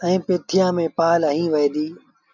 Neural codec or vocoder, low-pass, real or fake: none; 7.2 kHz; real